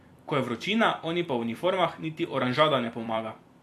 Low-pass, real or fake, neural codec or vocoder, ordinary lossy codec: 14.4 kHz; fake; vocoder, 44.1 kHz, 128 mel bands every 256 samples, BigVGAN v2; AAC, 64 kbps